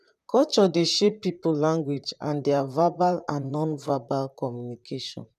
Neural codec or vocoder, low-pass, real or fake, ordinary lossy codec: vocoder, 44.1 kHz, 128 mel bands, Pupu-Vocoder; 14.4 kHz; fake; none